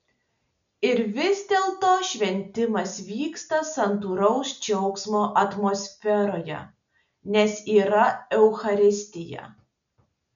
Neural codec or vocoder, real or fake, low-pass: none; real; 7.2 kHz